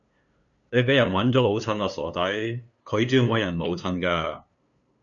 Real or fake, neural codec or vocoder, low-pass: fake; codec, 16 kHz, 2 kbps, FunCodec, trained on LibriTTS, 25 frames a second; 7.2 kHz